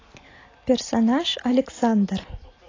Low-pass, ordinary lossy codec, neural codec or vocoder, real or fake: 7.2 kHz; AAC, 32 kbps; none; real